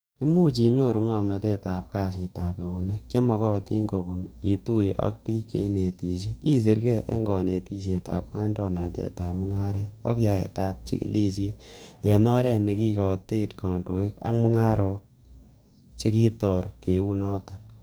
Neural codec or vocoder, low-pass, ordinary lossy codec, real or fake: codec, 44.1 kHz, 2.6 kbps, DAC; none; none; fake